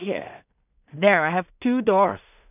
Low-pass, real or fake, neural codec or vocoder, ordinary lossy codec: 3.6 kHz; fake; codec, 16 kHz in and 24 kHz out, 0.4 kbps, LongCat-Audio-Codec, two codebook decoder; none